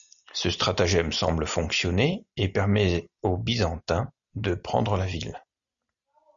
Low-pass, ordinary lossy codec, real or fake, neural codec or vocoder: 7.2 kHz; MP3, 96 kbps; real; none